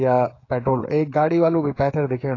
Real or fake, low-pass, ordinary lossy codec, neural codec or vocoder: fake; 7.2 kHz; AAC, 32 kbps; codec, 16 kHz, 16 kbps, FreqCodec, smaller model